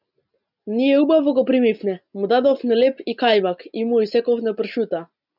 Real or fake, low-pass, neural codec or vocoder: real; 5.4 kHz; none